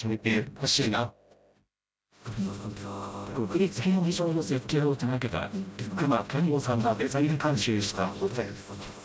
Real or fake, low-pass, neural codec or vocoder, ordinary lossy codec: fake; none; codec, 16 kHz, 0.5 kbps, FreqCodec, smaller model; none